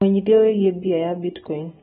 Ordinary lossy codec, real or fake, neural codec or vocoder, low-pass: AAC, 16 kbps; real; none; 19.8 kHz